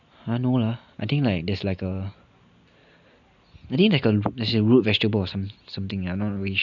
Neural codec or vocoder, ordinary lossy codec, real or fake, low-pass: none; none; real; 7.2 kHz